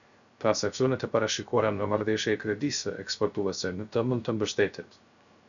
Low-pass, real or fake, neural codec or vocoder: 7.2 kHz; fake; codec, 16 kHz, 0.3 kbps, FocalCodec